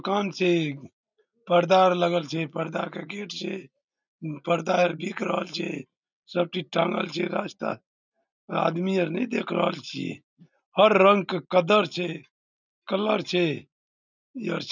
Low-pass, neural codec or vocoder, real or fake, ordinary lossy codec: 7.2 kHz; none; real; none